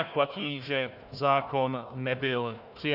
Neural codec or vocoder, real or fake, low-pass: codec, 16 kHz, 1 kbps, FunCodec, trained on Chinese and English, 50 frames a second; fake; 5.4 kHz